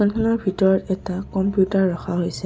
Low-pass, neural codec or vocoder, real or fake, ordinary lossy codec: none; none; real; none